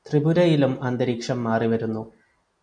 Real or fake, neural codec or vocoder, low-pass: real; none; 9.9 kHz